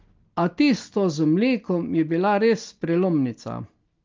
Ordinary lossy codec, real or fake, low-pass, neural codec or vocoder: Opus, 32 kbps; real; 7.2 kHz; none